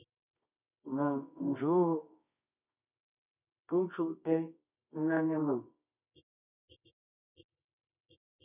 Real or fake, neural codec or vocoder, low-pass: fake; codec, 24 kHz, 0.9 kbps, WavTokenizer, medium music audio release; 3.6 kHz